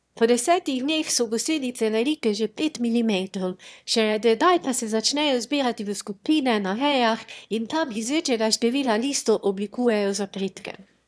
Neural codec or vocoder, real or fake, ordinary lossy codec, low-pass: autoencoder, 22.05 kHz, a latent of 192 numbers a frame, VITS, trained on one speaker; fake; none; none